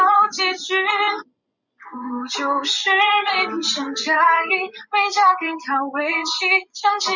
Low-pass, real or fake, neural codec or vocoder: 7.2 kHz; fake; vocoder, 24 kHz, 100 mel bands, Vocos